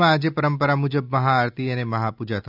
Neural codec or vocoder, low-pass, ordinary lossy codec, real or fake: none; 5.4 kHz; none; real